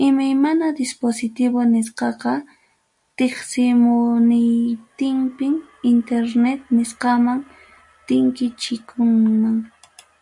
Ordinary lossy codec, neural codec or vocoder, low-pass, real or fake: MP3, 64 kbps; none; 10.8 kHz; real